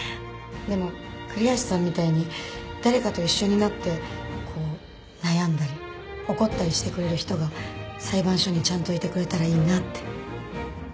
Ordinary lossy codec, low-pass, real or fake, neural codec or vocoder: none; none; real; none